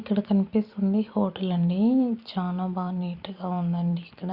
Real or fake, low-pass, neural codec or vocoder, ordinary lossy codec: fake; 5.4 kHz; vocoder, 44.1 kHz, 128 mel bands every 256 samples, BigVGAN v2; Opus, 64 kbps